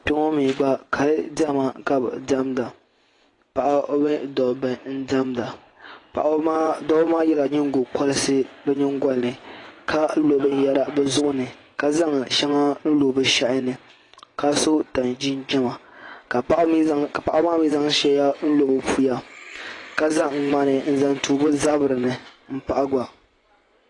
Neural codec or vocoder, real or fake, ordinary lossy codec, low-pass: vocoder, 24 kHz, 100 mel bands, Vocos; fake; AAC, 32 kbps; 10.8 kHz